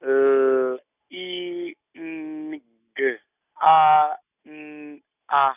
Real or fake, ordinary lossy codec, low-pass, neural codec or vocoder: real; none; 3.6 kHz; none